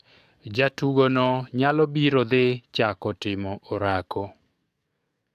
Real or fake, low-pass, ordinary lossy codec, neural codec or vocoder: fake; 14.4 kHz; AAC, 96 kbps; codec, 44.1 kHz, 7.8 kbps, DAC